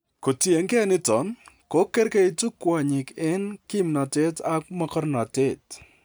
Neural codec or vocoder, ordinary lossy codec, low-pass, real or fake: none; none; none; real